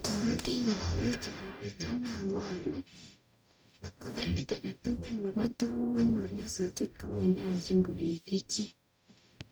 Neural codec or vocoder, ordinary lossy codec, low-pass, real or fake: codec, 44.1 kHz, 0.9 kbps, DAC; none; none; fake